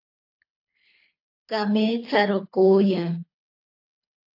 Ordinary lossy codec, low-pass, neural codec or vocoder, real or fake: AAC, 32 kbps; 5.4 kHz; codec, 24 kHz, 3 kbps, HILCodec; fake